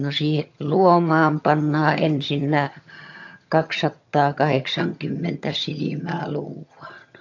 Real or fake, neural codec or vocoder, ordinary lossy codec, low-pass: fake; vocoder, 22.05 kHz, 80 mel bands, HiFi-GAN; AAC, 48 kbps; 7.2 kHz